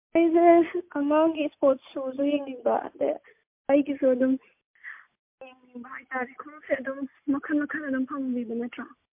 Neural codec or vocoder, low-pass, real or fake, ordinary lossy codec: none; 3.6 kHz; real; MP3, 32 kbps